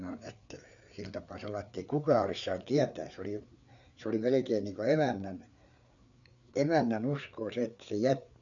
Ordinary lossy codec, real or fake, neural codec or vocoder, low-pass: none; fake; codec, 16 kHz, 4 kbps, FreqCodec, larger model; 7.2 kHz